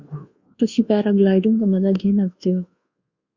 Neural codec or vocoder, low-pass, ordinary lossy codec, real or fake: codec, 24 kHz, 1.2 kbps, DualCodec; 7.2 kHz; Opus, 64 kbps; fake